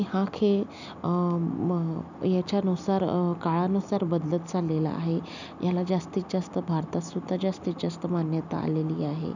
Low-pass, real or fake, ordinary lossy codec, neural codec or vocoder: 7.2 kHz; real; none; none